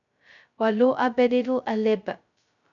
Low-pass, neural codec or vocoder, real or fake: 7.2 kHz; codec, 16 kHz, 0.2 kbps, FocalCodec; fake